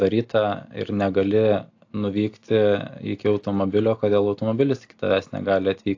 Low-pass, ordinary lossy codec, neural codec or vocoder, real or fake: 7.2 kHz; AAC, 48 kbps; none; real